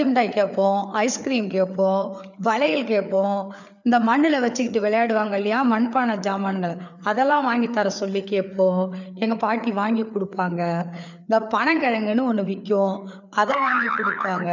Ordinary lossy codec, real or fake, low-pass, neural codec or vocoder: none; fake; 7.2 kHz; codec, 16 kHz, 4 kbps, FreqCodec, larger model